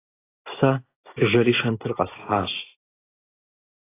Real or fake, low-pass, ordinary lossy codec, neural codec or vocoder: real; 3.6 kHz; AAC, 16 kbps; none